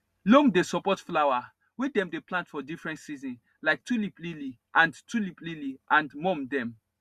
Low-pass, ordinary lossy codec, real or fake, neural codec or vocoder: 14.4 kHz; Opus, 64 kbps; real; none